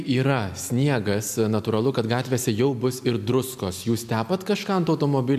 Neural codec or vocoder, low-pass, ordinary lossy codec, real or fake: none; 14.4 kHz; MP3, 96 kbps; real